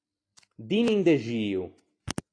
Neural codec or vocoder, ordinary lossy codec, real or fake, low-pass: none; AAC, 48 kbps; real; 9.9 kHz